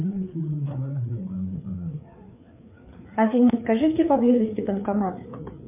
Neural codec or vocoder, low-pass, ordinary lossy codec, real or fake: codec, 16 kHz, 4 kbps, FreqCodec, larger model; 3.6 kHz; MP3, 32 kbps; fake